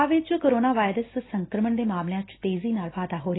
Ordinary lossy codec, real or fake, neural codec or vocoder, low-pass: AAC, 16 kbps; real; none; 7.2 kHz